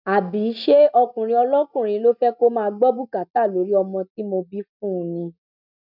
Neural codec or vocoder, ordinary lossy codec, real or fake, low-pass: none; none; real; 5.4 kHz